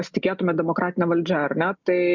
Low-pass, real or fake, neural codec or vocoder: 7.2 kHz; real; none